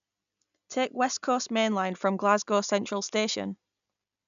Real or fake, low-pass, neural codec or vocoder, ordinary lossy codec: real; 7.2 kHz; none; none